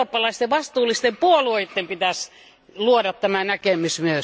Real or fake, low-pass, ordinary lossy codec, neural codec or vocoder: real; none; none; none